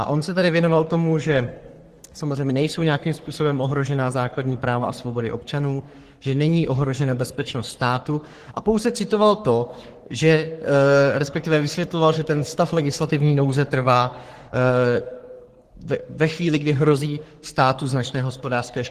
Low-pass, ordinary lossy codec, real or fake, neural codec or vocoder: 14.4 kHz; Opus, 16 kbps; fake; codec, 44.1 kHz, 3.4 kbps, Pupu-Codec